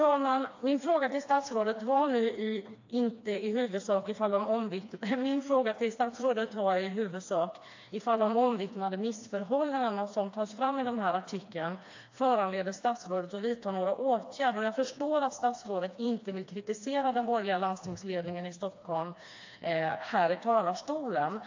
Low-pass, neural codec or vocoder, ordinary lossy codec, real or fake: 7.2 kHz; codec, 16 kHz, 2 kbps, FreqCodec, smaller model; AAC, 48 kbps; fake